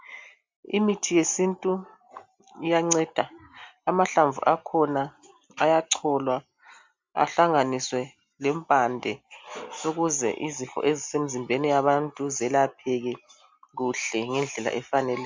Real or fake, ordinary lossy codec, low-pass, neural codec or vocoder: real; MP3, 64 kbps; 7.2 kHz; none